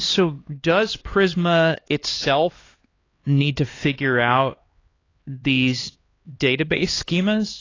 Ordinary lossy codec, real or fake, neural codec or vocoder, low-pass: AAC, 32 kbps; fake; codec, 16 kHz, 2 kbps, X-Codec, HuBERT features, trained on LibriSpeech; 7.2 kHz